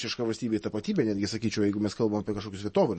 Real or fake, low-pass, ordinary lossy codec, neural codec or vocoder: real; 10.8 kHz; MP3, 32 kbps; none